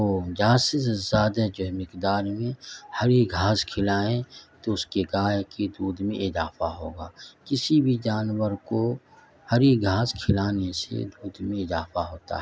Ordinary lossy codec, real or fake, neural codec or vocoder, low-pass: none; real; none; none